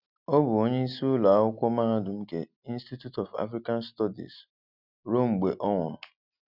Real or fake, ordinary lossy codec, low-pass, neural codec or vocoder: real; none; 5.4 kHz; none